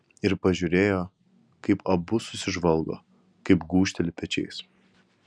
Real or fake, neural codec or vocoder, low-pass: real; none; 9.9 kHz